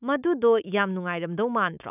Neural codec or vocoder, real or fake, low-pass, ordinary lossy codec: autoencoder, 48 kHz, 128 numbers a frame, DAC-VAE, trained on Japanese speech; fake; 3.6 kHz; none